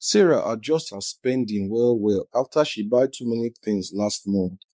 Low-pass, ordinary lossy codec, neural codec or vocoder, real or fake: none; none; codec, 16 kHz, 4 kbps, X-Codec, WavLM features, trained on Multilingual LibriSpeech; fake